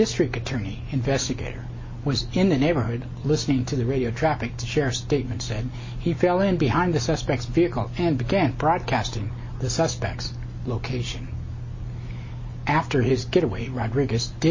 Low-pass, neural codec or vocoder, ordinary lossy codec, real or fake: 7.2 kHz; none; MP3, 32 kbps; real